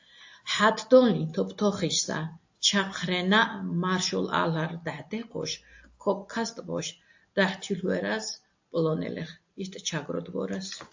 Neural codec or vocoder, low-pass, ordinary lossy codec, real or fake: none; 7.2 kHz; AAC, 48 kbps; real